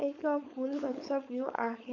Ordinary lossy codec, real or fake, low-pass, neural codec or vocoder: none; fake; 7.2 kHz; codec, 16 kHz, 4.8 kbps, FACodec